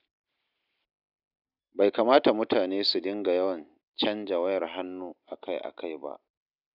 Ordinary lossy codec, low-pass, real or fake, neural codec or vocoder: none; 5.4 kHz; real; none